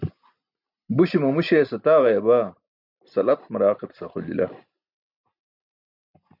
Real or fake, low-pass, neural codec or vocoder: real; 5.4 kHz; none